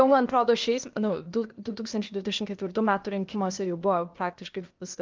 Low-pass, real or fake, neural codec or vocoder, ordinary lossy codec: 7.2 kHz; fake; codec, 16 kHz, 0.8 kbps, ZipCodec; Opus, 32 kbps